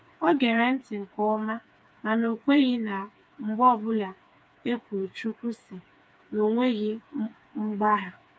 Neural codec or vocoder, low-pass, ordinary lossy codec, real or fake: codec, 16 kHz, 4 kbps, FreqCodec, smaller model; none; none; fake